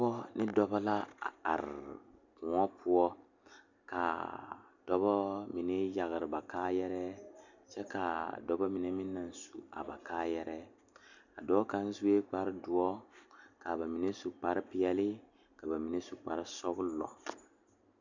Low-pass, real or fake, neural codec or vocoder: 7.2 kHz; real; none